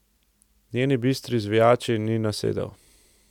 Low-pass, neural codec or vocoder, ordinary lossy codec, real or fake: 19.8 kHz; none; none; real